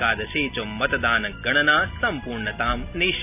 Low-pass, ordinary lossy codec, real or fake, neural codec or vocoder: 3.6 kHz; none; real; none